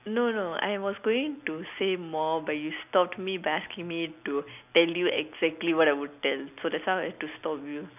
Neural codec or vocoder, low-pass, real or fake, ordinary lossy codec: none; 3.6 kHz; real; none